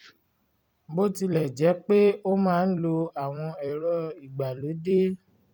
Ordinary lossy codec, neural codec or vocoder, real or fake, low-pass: none; vocoder, 44.1 kHz, 128 mel bands every 256 samples, BigVGAN v2; fake; 19.8 kHz